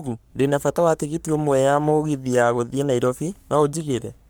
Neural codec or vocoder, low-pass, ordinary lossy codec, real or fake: codec, 44.1 kHz, 3.4 kbps, Pupu-Codec; none; none; fake